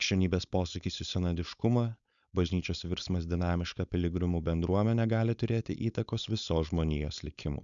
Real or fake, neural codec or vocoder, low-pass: fake; codec, 16 kHz, 4.8 kbps, FACodec; 7.2 kHz